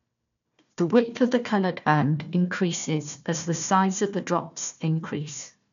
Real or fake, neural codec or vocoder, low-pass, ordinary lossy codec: fake; codec, 16 kHz, 1 kbps, FunCodec, trained on Chinese and English, 50 frames a second; 7.2 kHz; none